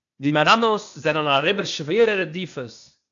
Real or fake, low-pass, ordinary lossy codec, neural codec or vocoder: fake; 7.2 kHz; AAC, 64 kbps; codec, 16 kHz, 0.8 kbps, ZipCodec